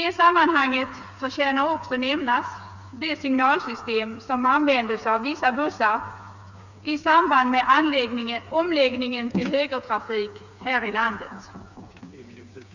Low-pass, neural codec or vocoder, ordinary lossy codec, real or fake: 7.2 kHz; codec, 16 kHz, 4 kbps, FreqCodec, smaller model; none; fake